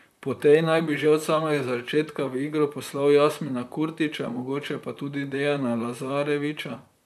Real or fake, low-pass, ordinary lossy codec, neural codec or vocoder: fake; 14.4 kHz; none; vocoder, 44.1 kHz, 128 mel bands, Pupu-Vocoder